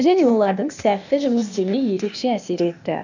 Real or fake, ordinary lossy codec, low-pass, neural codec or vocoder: fake; none; 7.2 kHz; codec, 16 kHz, 0.8 kbps, ZipCodec